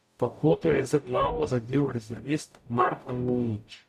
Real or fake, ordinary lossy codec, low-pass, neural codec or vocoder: fake; none; 14.4 kHz; codec, 44.1 kHz, 0.9 kbps, DAC